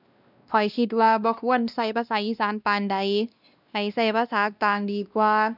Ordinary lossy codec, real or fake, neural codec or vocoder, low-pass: none; fake; codec, 16 kHz, 1 kbps, X-Codec, WavLM features, trained on Multilingual LibriSpeech; 5.4 kHz